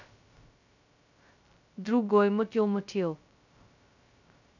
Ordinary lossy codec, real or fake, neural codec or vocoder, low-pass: none; fake; codec, 16 kHz, 0.2 kbps, FocalCodec; 7.2 kHz